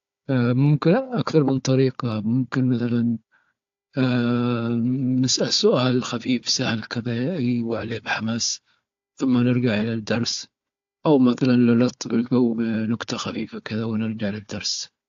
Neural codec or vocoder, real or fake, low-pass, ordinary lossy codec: codec, 16 kHz, 4 kbps, FunCodec, trained on Chinese and English, 50 frames a second; fake; 7.2 kHz; AAC, 48 kbps